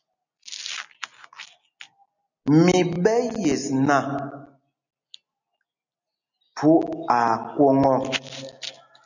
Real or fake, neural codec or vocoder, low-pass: real; none; 7.2 kHz